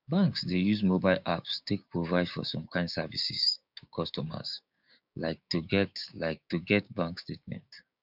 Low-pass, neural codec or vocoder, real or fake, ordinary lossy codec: 5.4 kHz; codec, 16 kHz, 6 kbps, DAC; fake; none